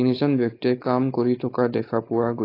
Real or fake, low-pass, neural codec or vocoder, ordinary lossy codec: fake; 5.4 kHz; codec, 16 kHz, 4.8 kbps, FACodec; AAC, 24 kbps